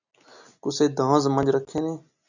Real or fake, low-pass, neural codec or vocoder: real; 7.2 kHz; none